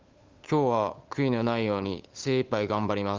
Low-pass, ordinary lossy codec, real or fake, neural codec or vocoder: 7.2 kHz; Opus, 32 kbps; fake; codec, 16 kHz, 8 kbps, FunCodec, trained on Chinese and English, 25 frames a second